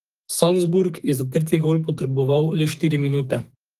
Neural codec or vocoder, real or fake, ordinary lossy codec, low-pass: codec, 32 kHz, 1.9 kbps, SNAC; fake; Opus, 24 kbps; 14.4 kHz